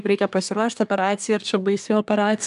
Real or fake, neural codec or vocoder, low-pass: fake; codec, 24 kHz, 1 kbps, SNAC; 10.8 kHz